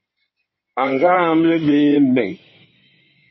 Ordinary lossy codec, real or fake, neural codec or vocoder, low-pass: MP3, 24 kbps; fake; codec, 16 kHz in and 24 kHz out, 2.2 kbps, FireRedTTS-2 codec; 7.2 kHz